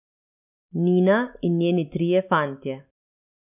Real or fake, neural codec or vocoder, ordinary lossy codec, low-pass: real; none; none; 3.6 kHz